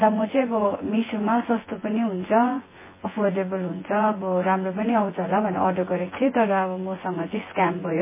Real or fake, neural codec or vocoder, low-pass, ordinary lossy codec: fake; vocoder, 24 kHz, 100 mel bands, Vocos; 3.6 kHz; MP3, 16 kbps